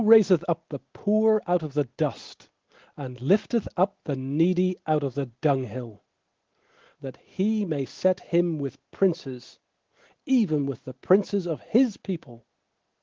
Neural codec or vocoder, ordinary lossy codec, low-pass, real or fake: none; Opus, 16 kbps; 7.2 kHz; real